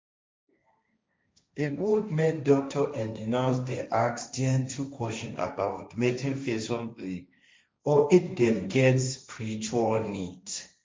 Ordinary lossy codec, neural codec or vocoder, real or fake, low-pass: none; codec, 16 kHz, 1.1 kbps, Voila-Tokenizer; fake; none